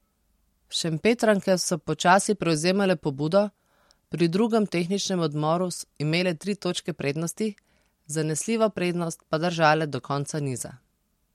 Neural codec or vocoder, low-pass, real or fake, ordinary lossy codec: none; 19.8 kHz; real; MP3, 64 kbps